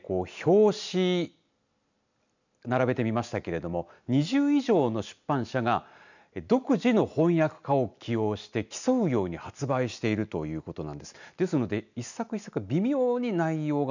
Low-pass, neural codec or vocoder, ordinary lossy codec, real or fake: 7.2 kHz; none; none; real